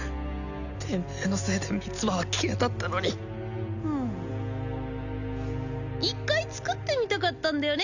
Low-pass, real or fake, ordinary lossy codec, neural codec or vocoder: 7.2 kHz; real; none; none